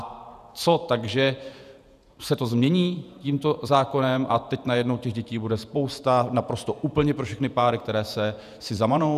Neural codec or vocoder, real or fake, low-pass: vocoder, 48 kHz, 128 mel bands, Vocos; fake; 14.4 kHz